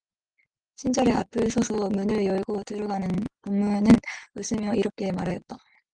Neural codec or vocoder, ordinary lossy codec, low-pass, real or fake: none; Opus, 24 kbps; 9.9 kHz; real